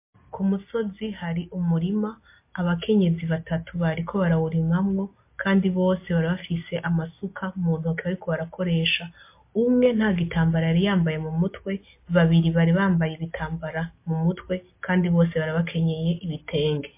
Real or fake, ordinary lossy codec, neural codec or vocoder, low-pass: real; MP3, 24 kbps; none; 3.6 kHz